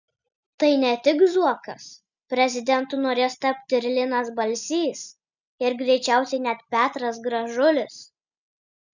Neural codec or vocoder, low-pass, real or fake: none; 7.2 kHz; real